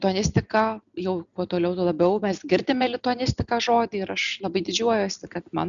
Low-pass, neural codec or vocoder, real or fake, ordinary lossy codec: 7.2 kHz; none; real; MP3, 96 kbps